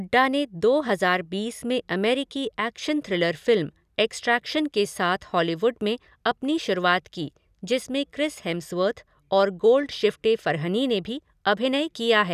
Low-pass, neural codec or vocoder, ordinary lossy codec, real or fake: 14.4 kHz; none; none; real